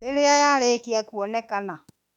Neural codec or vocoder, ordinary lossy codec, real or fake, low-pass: autoencoder, 48 kHz, 32 numbers a frame, DAC-VAE, trained on Japanese speech; none; fake; 19.8 kHz